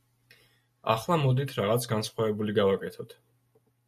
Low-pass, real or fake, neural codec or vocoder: 14.4 kHz; real; none